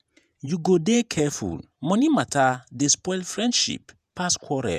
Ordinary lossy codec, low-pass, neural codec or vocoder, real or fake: none; 14.4 kHz; vocoder, 48 kHz, 128 mel bands, Vocos; fake